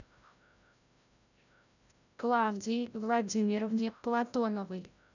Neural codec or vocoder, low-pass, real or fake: codec, 16 kHz, 0.5 kbps, FreqCodec, larger model; 7.2 kHz; fake